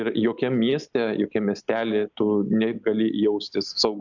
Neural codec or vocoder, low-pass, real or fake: none; 7.2 kHz; real